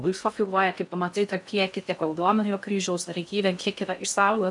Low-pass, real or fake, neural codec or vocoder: 10.8 kHz; fake; codec, 16 kHz in and 24 kHz out, 0.6 kbps, FocalCodec, streaming, 4096 codes